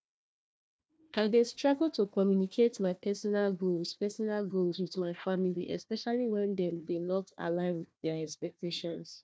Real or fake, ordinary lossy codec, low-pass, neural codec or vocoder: fake; none; none; codec, 16 kHz, 1 kbps, FunCodec, trained on Chinese and English, 50 frames a second